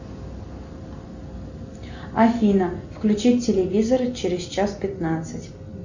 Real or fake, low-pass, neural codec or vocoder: real; 7.2 kHz; none